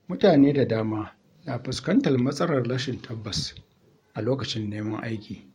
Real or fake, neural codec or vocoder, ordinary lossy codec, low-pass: fake; vocoder, 44.1 kHz, 128 mel bands every 512 samples, BigVGAN v2; MP3, 64 kbps; 19.8 kHz